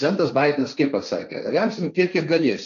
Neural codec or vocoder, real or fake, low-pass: codec, 16 kHz, 1.1 kbps, Voila-Tokenizer; fake; 7.2 kHz